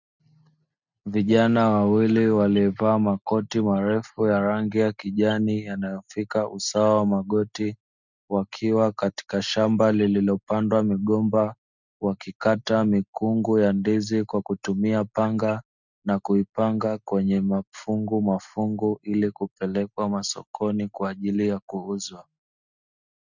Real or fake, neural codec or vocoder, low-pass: real; none; 7.2 kHz